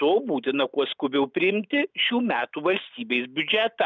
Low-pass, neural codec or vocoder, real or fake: 7.2 kHz; none; real